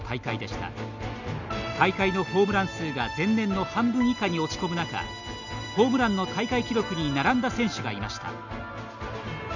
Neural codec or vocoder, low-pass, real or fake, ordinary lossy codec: none; 7.2 kHz; real; none